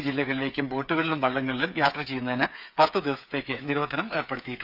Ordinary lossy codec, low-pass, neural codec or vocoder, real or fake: none; 5.4 kHz; codec, 16 kHz, 8 kbps, FreqCodec, smaller model; fake